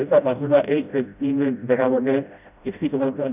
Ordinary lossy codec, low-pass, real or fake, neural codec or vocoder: none; 3.6 kHz; fake; codec, 16 kHz, 0.5 kbps, FreqCodec, smaller model